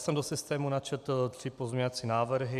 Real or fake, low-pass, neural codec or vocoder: real; 14.4 kHz; none